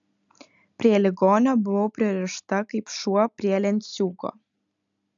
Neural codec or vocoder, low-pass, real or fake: none; 7.2 kHz; real